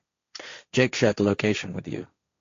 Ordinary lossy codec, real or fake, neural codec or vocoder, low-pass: AAC, 48 kbps; fake; codec, 16 kHz, 1.1 kbps, Voila-Tokenizer; 7.2 kHz